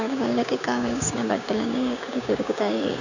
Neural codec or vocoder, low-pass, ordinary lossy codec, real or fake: codec, 16 kHz, 6 kbps, DAC; 7.2 kHz; none; fake